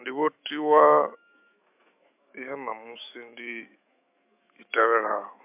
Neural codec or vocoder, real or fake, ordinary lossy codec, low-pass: autoencoder, 48 kHz, 128 numbers a frame, DAC-VAE, trained on Japanese speech; fake; MP3, 32 kbps; 3.6 kHz